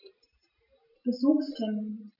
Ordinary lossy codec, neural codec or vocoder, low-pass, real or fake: none; none; 5.4 kHz; real